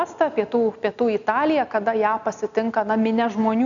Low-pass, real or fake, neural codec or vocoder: 7.2 kHz; real; none